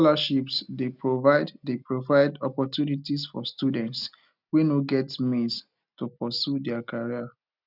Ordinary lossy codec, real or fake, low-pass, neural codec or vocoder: none; real; 5.4 kHz; none